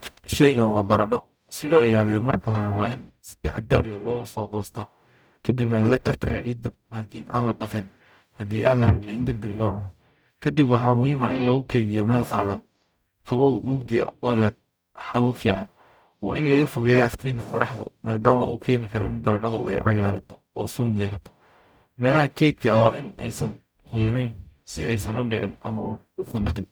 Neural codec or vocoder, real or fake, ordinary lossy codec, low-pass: codec, 44.1 kHz, 0.9 kbps, DAC; fake; none; none